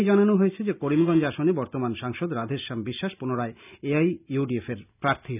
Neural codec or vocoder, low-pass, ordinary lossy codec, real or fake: none; 3.6 kHz; none; real